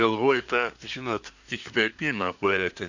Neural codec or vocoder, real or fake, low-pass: codec, 24 kHz, 1 kbps, SNAC; fake; 7.2 kHz